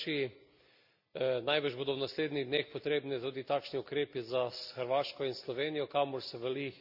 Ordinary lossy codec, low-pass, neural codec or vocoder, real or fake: none; 5.4 kHz; none; real